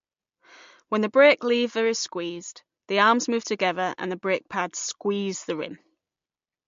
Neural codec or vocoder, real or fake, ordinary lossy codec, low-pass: none; real; MP3, 48 kbps; 7.2 kHz